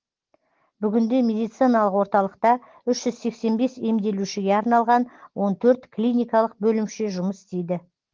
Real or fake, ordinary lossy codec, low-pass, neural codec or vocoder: real; Opus, 16 kbps; 7.2 kHz; none